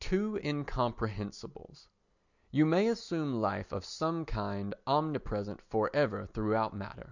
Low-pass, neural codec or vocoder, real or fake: 7.2 kHz; none; real